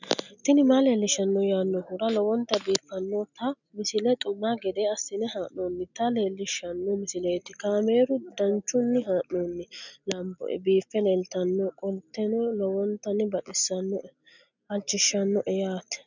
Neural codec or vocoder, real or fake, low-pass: none; real; 7.2 kHz